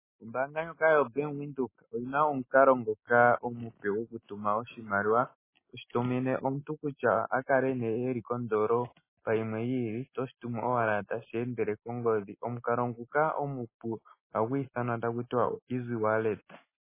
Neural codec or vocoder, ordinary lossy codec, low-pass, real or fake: none; MP3, 16 kbps; 3.6 kHz; real